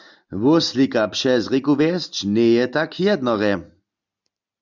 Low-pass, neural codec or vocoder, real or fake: 7.2 kHz; none; real